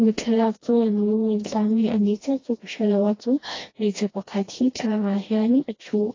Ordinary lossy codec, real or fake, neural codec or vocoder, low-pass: AAC, 32 kbps; fake; codec, 16 kHz, 1 kbps, FreqCodec, smaller model; 7.2 kHz